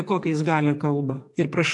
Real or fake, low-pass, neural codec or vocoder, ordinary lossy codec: fake; 10.8 kHz; codec, 44.1 kHz, 2.6 kbps, SNAC; AAC, 64 kbps